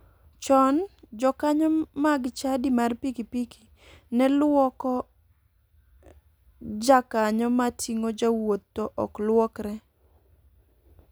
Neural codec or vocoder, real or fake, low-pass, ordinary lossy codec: none; real; none; none